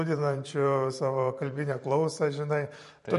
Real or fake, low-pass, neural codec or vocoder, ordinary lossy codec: fake; 14.4 kHz; vocoder, 44.1 kHz, 128 mel bands every 512 samples, BigVGAN v2; MP3, 48 kbps